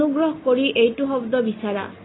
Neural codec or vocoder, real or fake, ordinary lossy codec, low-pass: none; real; AAC, 16 kbps; 7.2 kHz